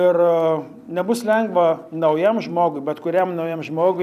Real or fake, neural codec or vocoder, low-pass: fake; vocoder, 44.1 kHz, 128 mel bands every 256 samples, BigVGAN v2; 14.4 kHz